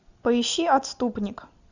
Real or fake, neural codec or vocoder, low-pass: real; none; 7.2 kHz